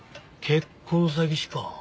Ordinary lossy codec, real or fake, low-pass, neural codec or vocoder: none; real; none; none